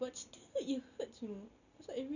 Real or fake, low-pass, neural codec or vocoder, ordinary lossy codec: real; 7.2 kHz; none; none